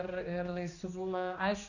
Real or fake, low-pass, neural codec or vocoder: fake; 7.2 kHz; codec, 16 kHz, 2 kbps, X-Codec, HuBERT features, trained on general audio